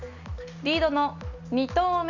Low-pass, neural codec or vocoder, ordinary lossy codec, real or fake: 7.2 kHz; none; Opus, 64 kbps; real